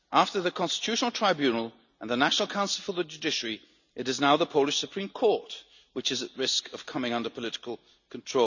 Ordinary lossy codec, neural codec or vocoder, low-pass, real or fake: none; none; 7.2 kHz; real